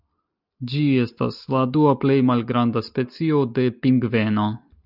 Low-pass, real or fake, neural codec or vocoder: 5.4 kHz; real; none